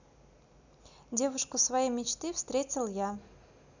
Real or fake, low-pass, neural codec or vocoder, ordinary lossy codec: real; 7.2 kHz; none; none